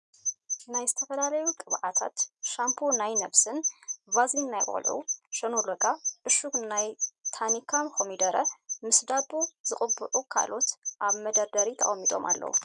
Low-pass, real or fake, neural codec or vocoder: 10.8 kHz; real; none